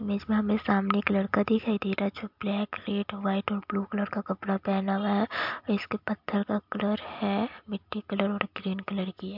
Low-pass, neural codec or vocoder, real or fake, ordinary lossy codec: 5.4 kHz; none; real; MP3, 48 kbps